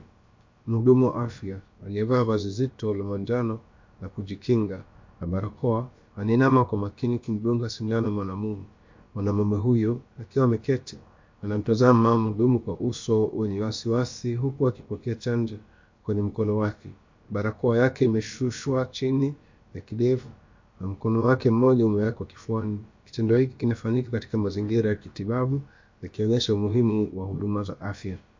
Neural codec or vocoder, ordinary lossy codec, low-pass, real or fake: codec, 16 kHz, about 1 kbps, DyCAST, with the encoder's durations; MP3, 48 kbps; 7.2 kHz; fake